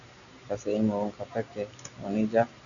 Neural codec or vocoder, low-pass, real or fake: none; 7.2 kHz; real